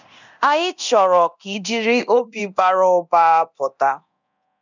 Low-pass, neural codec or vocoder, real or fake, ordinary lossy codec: 7.2 kHz; codec, 24 kHz, 0.9 kbps, DualCodec; fake; none